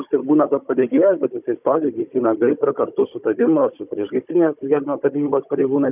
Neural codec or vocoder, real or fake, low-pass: codec, 16 kHz, 16 kbps, FunCodec, trained on Chinese and English, 50 frames a second; fake; 3.6 kHz